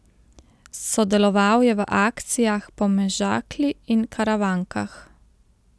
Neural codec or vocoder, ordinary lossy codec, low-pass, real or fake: none; none; none; real